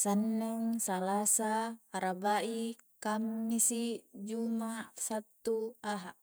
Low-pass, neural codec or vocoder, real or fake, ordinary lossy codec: none; vocoder, 48 kHz, 128 mel bands, Vocos; fake; none